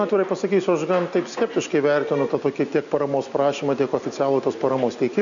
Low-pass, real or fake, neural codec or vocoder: 7.2 kHz; real; none